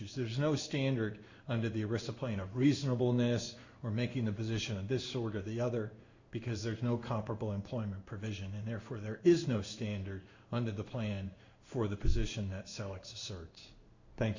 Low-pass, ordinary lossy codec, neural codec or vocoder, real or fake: 7.2 kHz; Opus, 64 kbps; codec, 16 kHz in and 24 kHz out, 1 kbps, XY-Tokenizer; fake